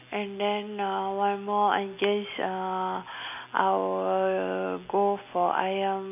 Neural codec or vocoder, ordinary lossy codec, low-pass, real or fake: none; none; 3.6 kHz; real